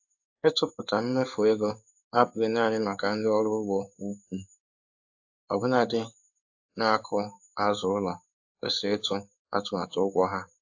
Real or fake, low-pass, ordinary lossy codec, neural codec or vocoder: fake; 7.2 kHz; AAC, 48 kbps; codec, 16 kHz in and 24 kHz out, 1 kbps, XY-Tokenizer